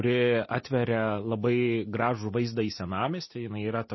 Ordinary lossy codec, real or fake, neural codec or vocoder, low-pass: MP3, 24 kbps; real; none; 7.2 kHz